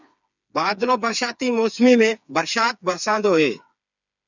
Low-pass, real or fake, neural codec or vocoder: 7.2 kHz; fake; codec, 16 kHz, 4 kbps, FreqCodec, smaller model